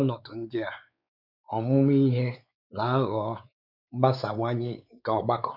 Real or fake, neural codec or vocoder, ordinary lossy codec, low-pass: fake; codec, 16 kHz, 4 kbps, X-Codec, WavLM features, trained on Multilingual LibriSpeech; none; 5.4 kHz